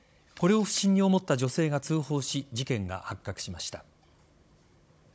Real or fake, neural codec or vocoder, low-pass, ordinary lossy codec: fake; codec, 16 kHz, 16 kbps, FunCodec, trained on Chinese and English, 50 frames a second; none; none